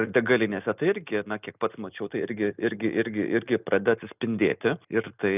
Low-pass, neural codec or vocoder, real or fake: 3.6 kHz; none; real